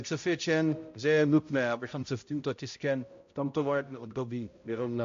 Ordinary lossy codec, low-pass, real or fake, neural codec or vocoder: AAC, 48 kbps; 7.2 kHz; fake; codec, 16 kHz, 0.5 kbps, X-Codec, HuBERT features, trained on balanced general audio